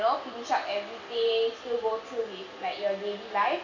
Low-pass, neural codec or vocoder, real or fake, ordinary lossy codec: 7.2 kHz; none; real; none